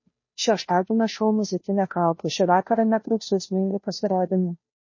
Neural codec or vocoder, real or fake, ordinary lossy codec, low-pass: codec, 16 kHz, 0.5 kbps, FunCodec, trained on Chinese and English, 25 frames a second; fake; MP3, 32 kbps; 7.2 kHz